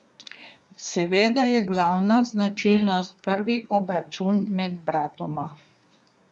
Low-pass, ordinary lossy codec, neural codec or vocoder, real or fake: 10.8 kHz; none; codec, 24 kHz, 1 kbps, SNAC; fake